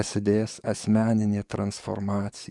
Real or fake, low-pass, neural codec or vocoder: fake; 10.8 kHz; codec, 44.1 kHz, 7.8 kbps, DAC